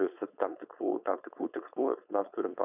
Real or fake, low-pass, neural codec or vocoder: fake; 3.6 kHz; codec, 16 kHz, 4.8 kbps, FACodec